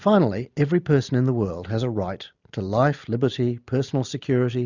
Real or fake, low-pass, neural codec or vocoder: real; 7.2 kHz; none